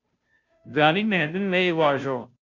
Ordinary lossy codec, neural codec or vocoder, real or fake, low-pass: MP3, 48 kbps; codec, 16 kHz, 0.5 kbps, FunCodec, trained on Chinese and English, 25 frames a second; fake; 7.2 kHz